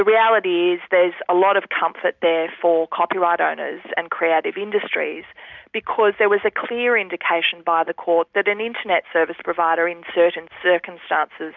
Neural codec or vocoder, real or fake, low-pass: none; real; 7.2 kHz